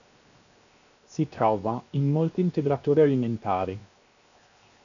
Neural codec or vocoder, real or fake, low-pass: codec, 16 kHz, 0.7 kbps, FocalCodec; fake; 7.2 kHz